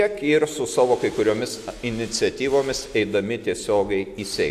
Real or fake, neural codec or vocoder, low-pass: real; none; 14.4 kHz